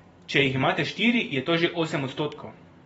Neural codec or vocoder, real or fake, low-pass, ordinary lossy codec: vocoder, 44.1 kHz, 128 mel bands every 512 samples, BigVGAN v2; fake; 19.8 kHz; AAC, 24 kbps